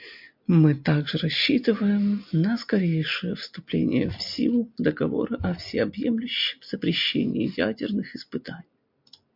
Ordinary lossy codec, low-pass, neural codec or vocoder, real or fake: MP3, 48 kbps; 5.4 kHz; none; real